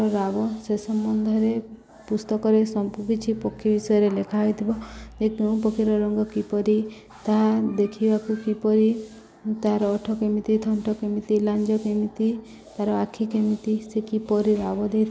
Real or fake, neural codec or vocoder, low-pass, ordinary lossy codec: real; none; none; none